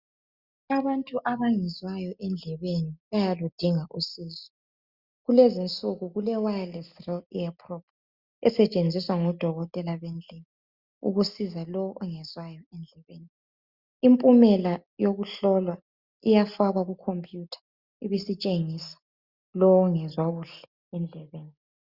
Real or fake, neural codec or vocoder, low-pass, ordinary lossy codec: real; none; 5.4 kHz; Opus, 64 kbps